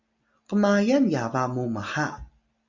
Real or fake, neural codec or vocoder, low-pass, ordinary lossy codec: real; none; 7.2 kHz; Opus, 64 kbps